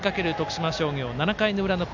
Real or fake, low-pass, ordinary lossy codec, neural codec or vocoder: real; 7.2 kHz; MP3, 64 kbps; none